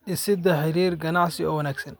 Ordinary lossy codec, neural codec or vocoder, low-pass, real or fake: none; none; none; real